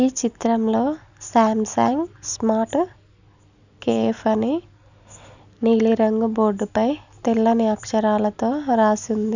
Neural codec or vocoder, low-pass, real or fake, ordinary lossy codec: none; 7.2 kHz; real; none